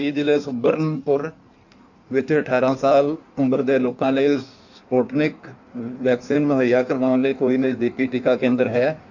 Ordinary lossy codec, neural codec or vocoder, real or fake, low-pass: none; codec, 16 kHz in and 24 kHz out, 1.1 kbps, FireRedTTS-2 codec; fake; 7.2 kHz